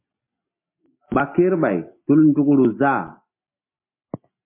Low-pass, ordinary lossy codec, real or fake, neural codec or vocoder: 3.6 kHz; MP3, 24 kbps; real; none